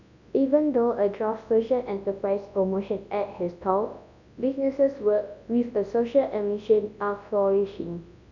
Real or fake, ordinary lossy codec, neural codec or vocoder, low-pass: fake; none; codec, 24 kHz, 0.9 kbps, WavTokenizer, large speech release; 7.2 kHz